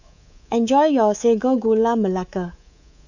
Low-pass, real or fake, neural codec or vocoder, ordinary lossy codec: 7.2 kHz; fake; codec, 24 kHz, 3.1 kbps, DualCodec; none